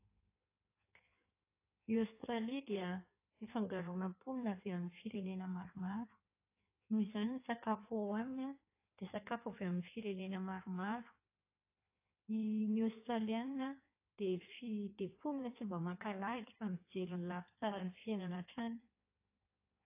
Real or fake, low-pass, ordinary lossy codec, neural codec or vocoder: fake; 3.6 kHz; AAC, 24 kbps; codec, 16 kHz in and 24 kHz out, 1.1 kbps, FireRedTTS-2 codec